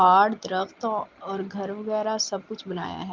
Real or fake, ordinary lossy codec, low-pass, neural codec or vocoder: real; Opus, 24 kbps; 7.2 kHz; none